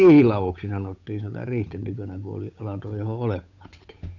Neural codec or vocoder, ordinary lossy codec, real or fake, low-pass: codec, 16 kHz, 8 kbps, FunCodec, trained on Chinese and English, 25 frames a second; none; fake; 7.2 kHz